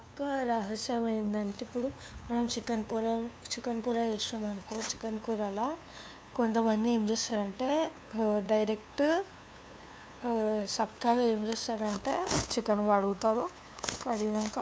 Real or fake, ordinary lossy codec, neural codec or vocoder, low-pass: fake; none; codec, 16 kHz, 2 kbps, FunCodec, trained on LibriTTS, 25 frames a second; none